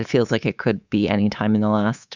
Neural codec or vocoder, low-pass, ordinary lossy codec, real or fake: codec, 24 kHz, 3.1 kbps, DualCodec; 7.2 kHz; Opus, 64 kbps; fake